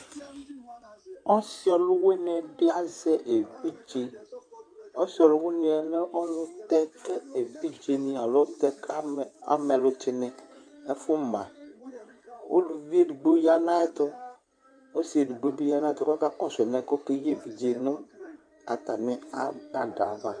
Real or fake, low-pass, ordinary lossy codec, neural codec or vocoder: fake; 9.9 kHz; AAC, 64 kbps; codec, 16 kHz in and 24 kHz out, 2.2 kbps, FireRedTTS-2 codec